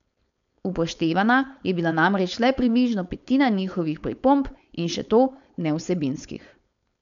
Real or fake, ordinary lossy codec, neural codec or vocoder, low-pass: fake; none; codec, 16 kHz, 4.8 kbps, FACodec; 7.2 kHz